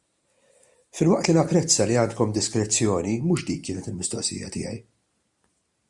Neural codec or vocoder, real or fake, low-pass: none; real; 10.8 kHz